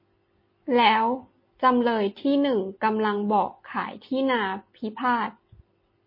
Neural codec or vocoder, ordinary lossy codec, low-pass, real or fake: none; MP3, 24 kbps; 5.4 kHz; real